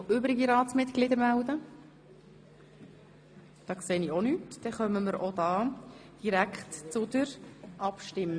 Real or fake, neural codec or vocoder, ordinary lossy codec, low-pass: real; none; AAC, 64 kbps; 9.9 kHz